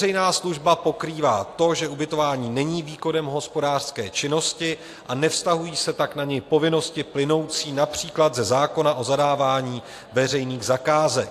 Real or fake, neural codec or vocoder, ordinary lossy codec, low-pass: real; none; AAC, 64 kbps; 14.4 kHz